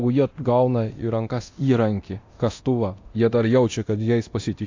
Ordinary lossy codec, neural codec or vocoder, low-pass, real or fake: AAC, 48 kbps; codec, 24 kHz, 0.9 kbps, DualCodec; 7.2 kHz; fake